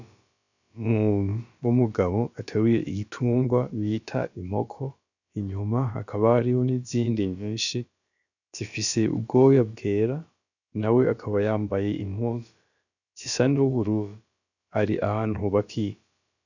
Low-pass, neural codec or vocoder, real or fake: 7.2 kHz; codec, 16 kHz, about 1 kbps, DyCAST, with the encoder's durations; fake